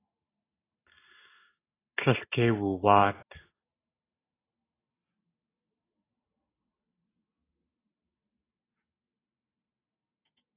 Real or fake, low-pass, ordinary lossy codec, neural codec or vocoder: real; 3.6 kHz; AAC, 16 kbps; none